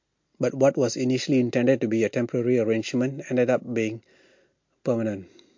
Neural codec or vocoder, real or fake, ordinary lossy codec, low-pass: none; real; MP3, 48 kbps; 7.2 kHz